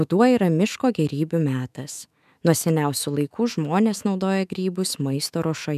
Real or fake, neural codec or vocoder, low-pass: fake; autoencoder, 48 kHz, 128 numbers a frame, DAC-VAE, trained on Japanese speech; 14.4 kHz